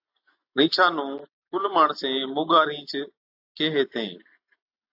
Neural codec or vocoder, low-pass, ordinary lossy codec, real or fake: none; 5.4 kHz; AAC, 48 kbps; real